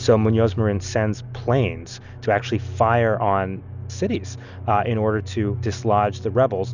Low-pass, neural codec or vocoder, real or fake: 7.2 kHz; none; real